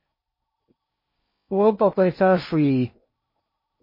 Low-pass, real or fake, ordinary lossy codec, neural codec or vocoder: 5.4 kHz; fake; MP3, 24 kbps; codec, 16 kHz in and 24 kHz out, 0.6 kbps, FocalCodec, streaming, 4096 codes